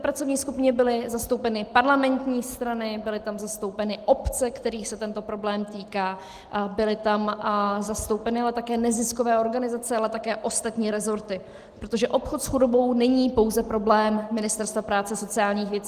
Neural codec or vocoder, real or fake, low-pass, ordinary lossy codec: none; real; 14.4 kHz; Opus, 24 kbps